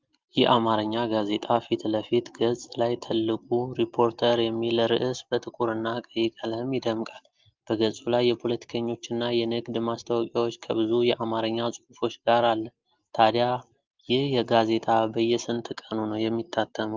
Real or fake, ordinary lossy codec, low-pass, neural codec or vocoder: real; Opus, 32 kbps; 7.2 kHz; none